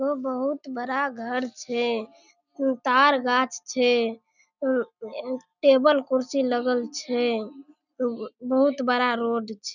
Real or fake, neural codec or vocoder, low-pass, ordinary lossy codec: real; none; 7.2 kHz; none